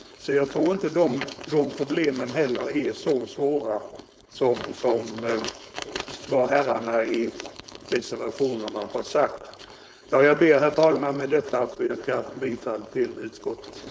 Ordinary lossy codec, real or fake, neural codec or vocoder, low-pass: none; fake; codec, 16 kHz, 4.8 kbps, FACodec; none